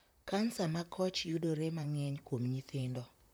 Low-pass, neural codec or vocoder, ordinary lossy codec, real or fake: none; vocoder, 44.1 kHz, 128 mel bands, Pupu-Vocoder; none; fake